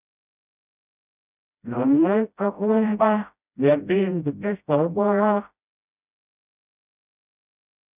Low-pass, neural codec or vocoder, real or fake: 3.6 kHz; codec, 16 kHz, 0.5 kbps, FreqCodec, smaller model; fake